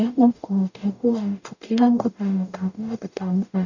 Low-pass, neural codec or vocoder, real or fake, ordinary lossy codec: 7.2 kHz; codec, 44.1 kHz, 0.9 kbps, DAC; fake; none